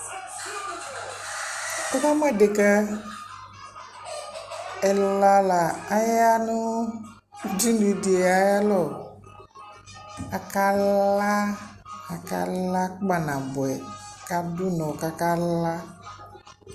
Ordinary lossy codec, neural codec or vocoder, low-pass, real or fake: Opus, 64 kbps; none; 14.4 kHz; real